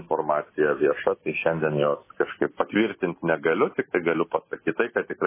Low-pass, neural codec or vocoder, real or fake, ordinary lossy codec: 3.6 kHz; none; real; MP3, 16 kbps